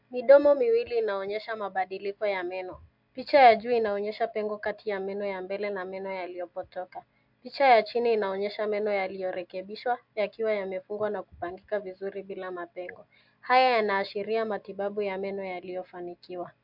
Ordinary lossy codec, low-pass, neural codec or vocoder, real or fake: MP3, 48 kbps; 5.4 kHz; none; real